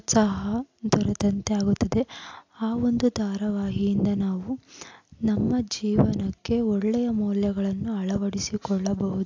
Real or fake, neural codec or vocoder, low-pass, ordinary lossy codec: real; none; 7.2 kHz; AAC, 48 kbps